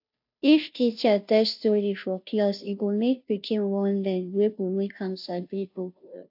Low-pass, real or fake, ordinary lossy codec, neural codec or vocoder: 5.4 kHz; fake; AAC, 48 kbps; codec, 16 kHz, 0.5 kbps, FunCodec, trained on Chinese and English, 25 frames a second